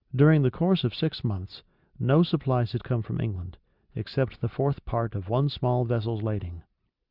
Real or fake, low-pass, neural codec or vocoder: real; 5.4 kHz; none